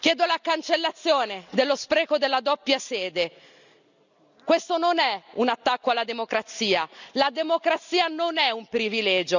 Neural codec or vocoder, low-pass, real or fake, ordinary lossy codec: none; 7.2 kHz; real; none